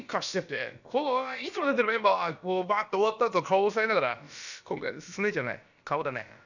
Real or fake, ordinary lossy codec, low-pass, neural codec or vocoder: fake; none; 7.2 kHz; codec, 16 kHz, about 1 kbps, DyCAST, with the encoder's durations